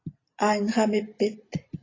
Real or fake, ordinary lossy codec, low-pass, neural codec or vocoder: real; AAC, 32 kbps; 7.2 kHz; none